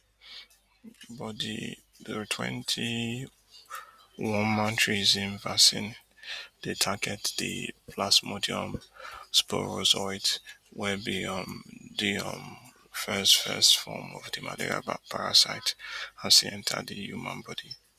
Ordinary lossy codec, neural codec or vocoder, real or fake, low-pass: Opus, 64 kbps; none; real; 14.4 kHz